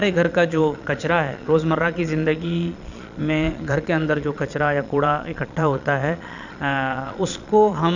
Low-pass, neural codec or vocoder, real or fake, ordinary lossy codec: 7.2 kHz; vocoder, 22.05 kHz, 80 mel bands, Vocos; fake; none